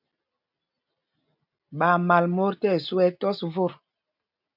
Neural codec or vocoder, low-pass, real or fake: none; 5.4 kHz; real